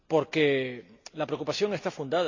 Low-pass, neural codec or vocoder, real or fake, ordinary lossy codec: 7.2 kHz; none; real; AAC, 48 kbps